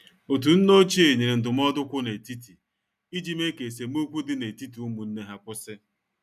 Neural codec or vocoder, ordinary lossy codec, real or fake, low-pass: none; none; real; 14.4 kHz